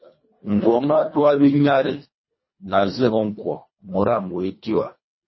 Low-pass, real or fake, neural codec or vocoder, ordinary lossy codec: 7.2 kHz; fake; codec, 24 kHz, 1.5 kbps, HILCodec; MP3, 24 kbps